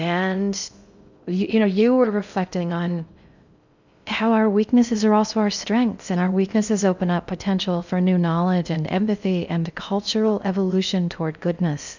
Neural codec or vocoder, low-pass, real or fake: codec, 16 kHz in and 24 kHz out, 0.6 kbps, FocalCodec, streaming, 2048 codes; 7.2 kHz; fake